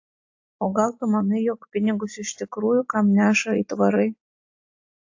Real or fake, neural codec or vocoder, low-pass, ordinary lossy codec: real; none; 7.2 kHz; AAC, 48 kbps